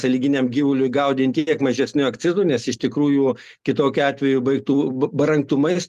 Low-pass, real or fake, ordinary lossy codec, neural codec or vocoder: 14.4 kHz; real; Opus, 32 kbps; none